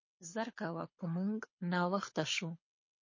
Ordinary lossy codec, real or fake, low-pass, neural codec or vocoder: MP3, 32 kbps; fake; 7.2 kHz; codec, 24 kHz, 6 kbps, HILCodec